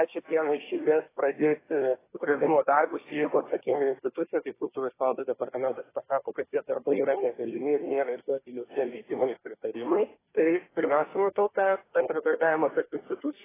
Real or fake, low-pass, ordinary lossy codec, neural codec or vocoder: fake; 3.6 kHz; AAC, 16 kbps; codec, 24 kHz, 1 kbps, SNAC